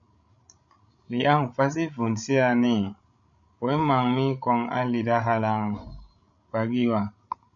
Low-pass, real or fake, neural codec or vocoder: 7.2 kHz; fake; codec, 16 kHz, 16 kbps, FreqCodec, larger model